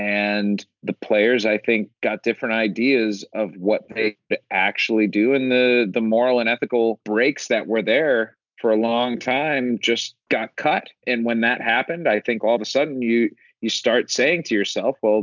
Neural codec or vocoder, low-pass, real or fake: none; 7.2 kHz; real